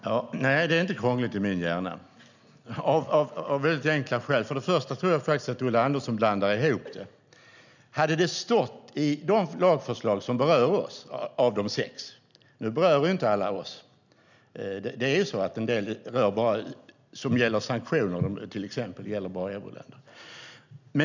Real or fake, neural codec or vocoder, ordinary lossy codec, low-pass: real; none; none; 7.2 kHz